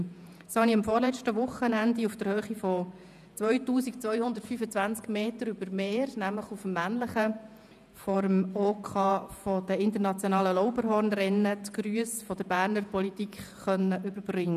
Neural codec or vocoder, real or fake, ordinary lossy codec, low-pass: vocoder, 48 kHz, 128 mel bands, Vocos; fake; none; 14.4 kHz